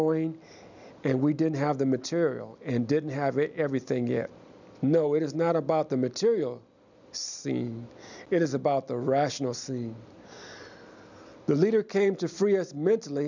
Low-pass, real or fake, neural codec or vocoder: 7.2 kHz; real; none